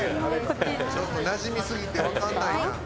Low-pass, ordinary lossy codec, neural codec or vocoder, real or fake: none; none; none; real